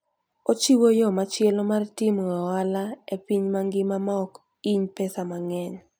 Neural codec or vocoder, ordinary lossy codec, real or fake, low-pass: none; none; real; none